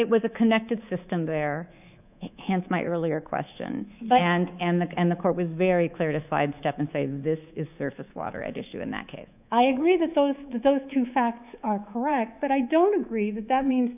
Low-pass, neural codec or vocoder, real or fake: 3.6 kHz; codec, 24 kHz, 3.1 kbps, DualCodec; fake